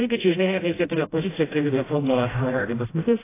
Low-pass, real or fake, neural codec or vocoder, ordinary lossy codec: 3.6 kHz; fake; codec, 16 kHz, 0.5 kbps, FreqCodec, smaller model; AAC, 16 kbps